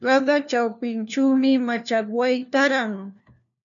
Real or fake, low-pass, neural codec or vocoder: fake; 7.2 kHz; codec, 16 kHz, 1 kbps, FunCodec, trained on LibriTTS, 50 frames a second